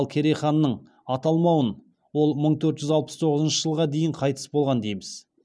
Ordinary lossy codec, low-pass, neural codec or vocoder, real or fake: none; none; none; real